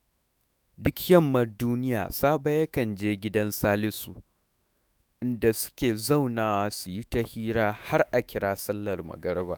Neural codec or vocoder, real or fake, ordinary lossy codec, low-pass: autoencoder, 48 kHz, 128 numbers a frame, DAC-VAE, trained on Japanese speech; fake; none; none